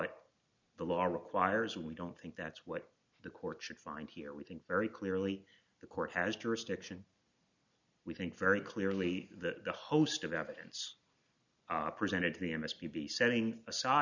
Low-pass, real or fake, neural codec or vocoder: 7.2 kHz; real; none